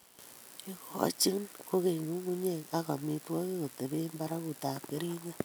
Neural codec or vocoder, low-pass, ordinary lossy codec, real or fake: none; none; none; real